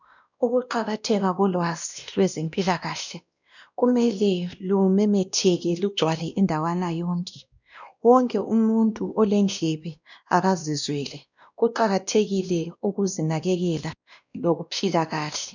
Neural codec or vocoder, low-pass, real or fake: codec, 16 kHz, 1 kbps, X-Codec, WavLM features, trained on Multilingual LibriSpeech; 7.2 kHz; fake